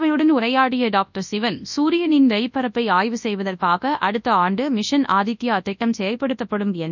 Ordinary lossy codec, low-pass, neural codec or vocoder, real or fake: none; 7.2 kHz; codec, 24 kHz, 0.9 kbps, WavTokenizer, large speech release; fake